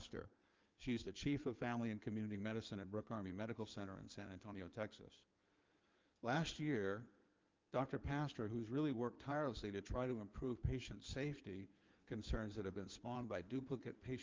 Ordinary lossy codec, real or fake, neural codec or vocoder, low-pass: Opus, 24 kbps; fake; codec, 16 kHz in and 24 kHz out, 2.2 kbps, FireRedTTS-2 codec; 7.2 kHz